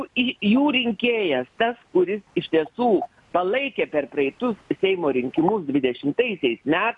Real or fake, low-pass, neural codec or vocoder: real; 10.8 kHz; none